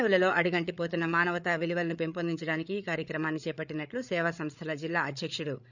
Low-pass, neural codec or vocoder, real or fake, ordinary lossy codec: 7.2 kHz; codec, 16 kHz, 8 kbps, FreqCodec, larger model; fake; none